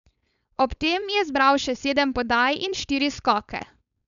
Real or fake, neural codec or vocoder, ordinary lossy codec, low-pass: fake; codec, 16 kHz, 4.8 kbps, FACodec; none; 7.2 kHz